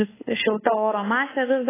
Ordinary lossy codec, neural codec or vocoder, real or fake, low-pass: AAC, 16 kbps; codec, 24 kHz, 1.2 kbps, DualCodec; fake; 3.6 kHz